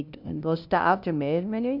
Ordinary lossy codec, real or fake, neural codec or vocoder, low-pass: none; fake; codec, 16 kHz, 0.5 kbps, FunCodec, trained on LibriTTS, 25 frames a second; 5.4 kHz